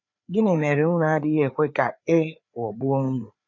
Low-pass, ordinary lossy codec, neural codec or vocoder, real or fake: 7.2 kHz; none; codec, 16 kHz, 4 kbps, FreqCodec, larger model; fake